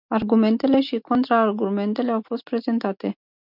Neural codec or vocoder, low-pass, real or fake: none; 5.4 kHz; real